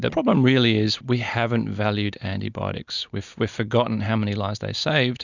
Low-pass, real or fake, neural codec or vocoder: 7.2 kHz; real; none